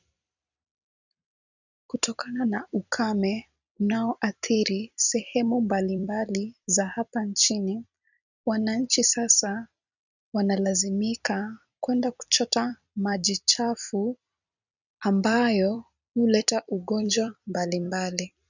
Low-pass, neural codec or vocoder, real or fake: 7.2 kHz; vocoder, 44.1 kHz, 128 mel bands every 256 samples, BigVGAN v2; fake